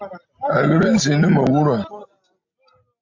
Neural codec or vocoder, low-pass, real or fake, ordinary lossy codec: none; 7.2 kHz; real; AAC, 48 kbps